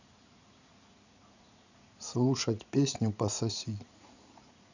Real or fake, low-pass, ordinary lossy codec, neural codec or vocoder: fake; 7.2 kHz; none; vocoder, 44.1 kHz, 80 mel bands, Vocos